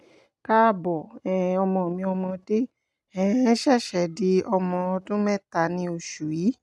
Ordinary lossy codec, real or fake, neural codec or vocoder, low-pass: none; real; none; none